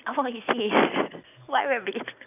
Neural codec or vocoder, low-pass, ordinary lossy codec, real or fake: none; 3.6 kHz; none; real